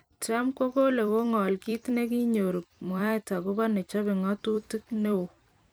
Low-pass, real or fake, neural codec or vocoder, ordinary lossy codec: none; fake; vocoder, 44.1 kHz, 128 mel bands every 256 samples, BigVGAN v2; none